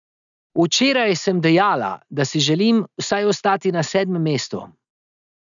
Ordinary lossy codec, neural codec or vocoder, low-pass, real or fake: none; none; 7.2 kHz; real